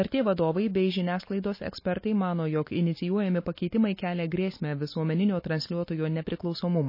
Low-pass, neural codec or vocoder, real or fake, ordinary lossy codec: 5.4 kHz; none; real; MP3, 24 kbps